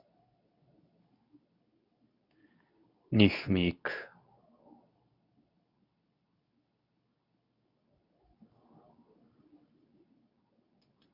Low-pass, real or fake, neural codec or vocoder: 5.4 kHz; fake; codec, 24 kHz, 0.9 kbps, WavTokenizer, medium speech release version 2